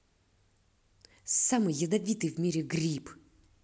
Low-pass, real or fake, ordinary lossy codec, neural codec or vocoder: none; real; none; none